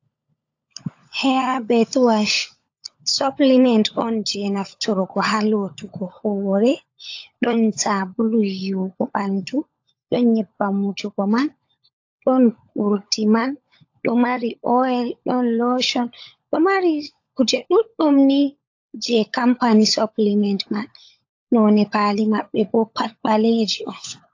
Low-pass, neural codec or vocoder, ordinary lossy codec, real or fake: 7.2 kHz; codec, 16 kHz, 16 kbps, FunCodec, trained on LibriTTS, 50 frames a second; AAC, 48 kbps; fake